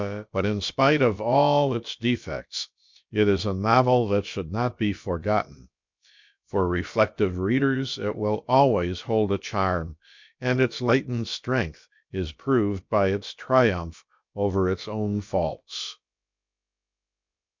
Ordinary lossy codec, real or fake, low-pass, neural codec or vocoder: MP3, 64 kbps; fake; 7.2 kHz; codec, 16 kHz, about 1 kbps, DyCAST, with the encoder's durations